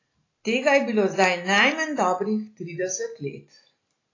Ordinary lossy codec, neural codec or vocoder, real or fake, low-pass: AAC, 32 kbps; none; real; 7.2 kHz